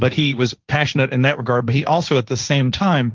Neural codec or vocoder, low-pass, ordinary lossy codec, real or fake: codec, 16 kHz, 1.1 kbps, Voila-Tokenizer; 7.2 kHz; Opus, 32 kbps; fake